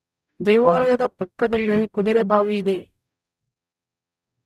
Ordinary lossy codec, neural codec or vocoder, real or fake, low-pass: none; codec, 44.1 kHz, 0.9 kbps, DAC; fake; 14.4 kHz